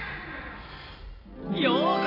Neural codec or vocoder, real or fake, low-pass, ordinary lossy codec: none; real; 5.4 kHz; AAC, 24 kbps